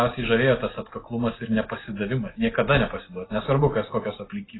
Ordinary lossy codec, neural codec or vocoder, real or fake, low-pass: AAC, 16 kbps; none; real; 7.2 kHz